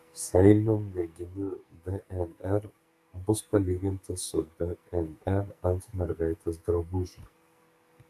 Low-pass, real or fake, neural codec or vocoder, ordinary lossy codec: 14.4 kHz; fake; codec, 44.1 kHz, 2.6 kbps, SNAC; AAC, 96 kbps